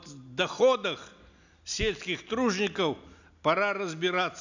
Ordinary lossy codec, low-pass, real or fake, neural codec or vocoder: none; 7.2 kHz; real; none